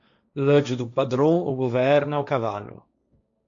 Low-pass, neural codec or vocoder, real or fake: 7.2 kHz; codec, 16 kHz, 1.1 kbps, Voila-Tokenizer; fake